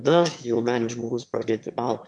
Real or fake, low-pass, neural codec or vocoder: fake; 9.9 kHz; autoencoder, 22.05 kHz, a latent of 192 numbers a frame, VITS, trained on one speaker